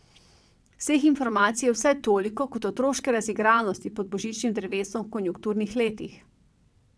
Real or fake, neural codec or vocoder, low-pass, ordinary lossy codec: fake; vocoder, 22.05 kHz, 80 mel bands, WaveNeXt; none; none